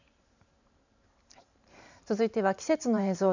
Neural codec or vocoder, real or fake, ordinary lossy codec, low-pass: vocoder, 44.1 kHz, 128 mel bands every 512 samples, BigVGAN v2; fake; none; 7.2 kHz